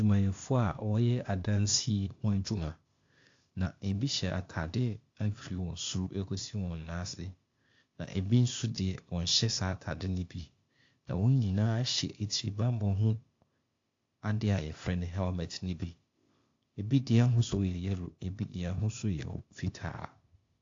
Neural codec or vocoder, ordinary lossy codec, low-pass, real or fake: codec, 16 kHz, 0.8 kbps, ZipCodec; AAC, 48 kbps; 7.2 kHz; fake